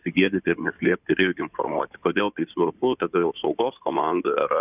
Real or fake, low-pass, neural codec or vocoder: fake; 3.6 kHz; codec, 16 kHz in and 24 kHz out, 2.2 kbps, FireRedTTS-2 codec